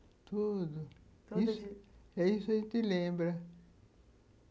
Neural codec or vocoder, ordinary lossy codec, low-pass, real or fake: none; none; none; real